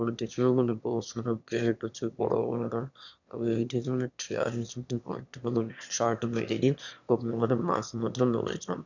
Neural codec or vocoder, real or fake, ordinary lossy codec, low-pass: autoencoder, 22.05 kHz, a latent of 192 numbers a frame, VITS, trained on one speaker; fake; none; 7.2 kHz